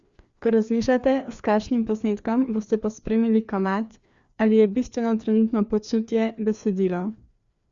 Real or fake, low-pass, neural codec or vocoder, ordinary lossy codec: fake; 7.2 kHz; codec, 16 kHz, 2 kbps, FreqCodec, larger model; Opus, 64 kbps